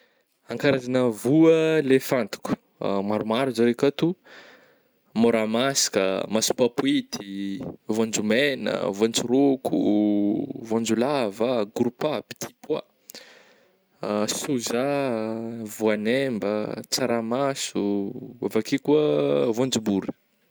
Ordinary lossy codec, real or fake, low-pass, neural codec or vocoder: none; real; none; none